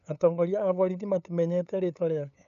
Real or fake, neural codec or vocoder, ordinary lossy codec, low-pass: fake; codec, 16 kHz, 8 kbps, FunCodec, trained on LibriTTS, 25 frames a second; none; 7.2 kHz